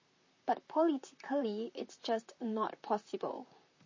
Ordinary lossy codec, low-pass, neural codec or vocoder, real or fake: MP3, 32 kbps; 7.2 kHz; vocoder, 44.1 kHz, 128 mel bands, Pupu-Vocoder; fake